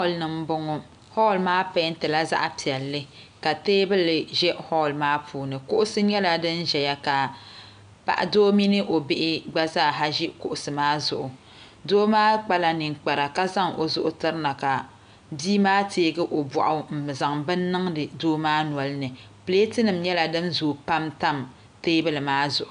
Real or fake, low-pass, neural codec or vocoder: real; 9.9 kHz; none